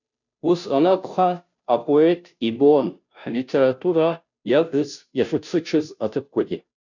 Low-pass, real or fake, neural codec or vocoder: 7.2 kHz; fake; codec, 16 kHz, 0.5 kbps, FunCodec, trained on Chinese and English, 25 frames a second